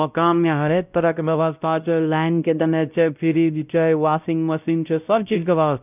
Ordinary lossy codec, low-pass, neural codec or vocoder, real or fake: none; 3.6 kHz; codec, 16 kHz, 0.5 kbps, X-Codec, WavLM features, trained on Multilingual LibriSpeech; fake